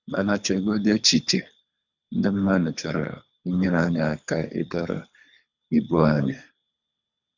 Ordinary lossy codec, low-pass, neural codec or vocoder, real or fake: none; 7.2 kHz; codec, 24 kHz, 3 kbps, HILCodec; fake